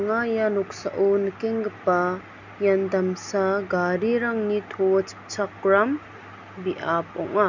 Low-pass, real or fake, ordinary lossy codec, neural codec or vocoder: 7.2 kHz; real; none; none